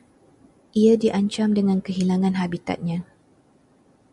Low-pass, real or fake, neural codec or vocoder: 10.8 kHz; real; none